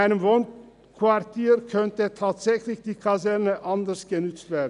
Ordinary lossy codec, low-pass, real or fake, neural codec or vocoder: none; 10.8 kHz; real; none